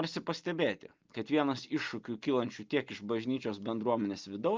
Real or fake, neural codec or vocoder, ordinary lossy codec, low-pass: real; none; Opus, 32 kbps; 7.2 kHz